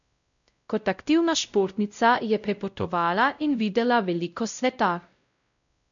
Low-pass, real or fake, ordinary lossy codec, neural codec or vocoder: 7.2 kHz; fake; none; codec, 16 kHz, 0.5 kbps, X-Codec, WavLM features, trained on Multilingual LibriSpeech